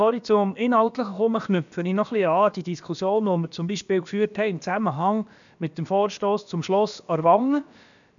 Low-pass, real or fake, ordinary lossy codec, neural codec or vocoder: 7.2 kHz; fake; none; codec, 16 kHz, about 1 kbps, DyCAST, with the encoder's durations